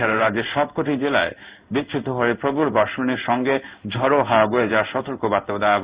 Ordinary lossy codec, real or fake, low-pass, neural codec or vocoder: Opus, 64 kbps; fake; 3.6 kHz; codec, 16 kHz in and 24 kHz out, 1 kbps, XY-Tokenizer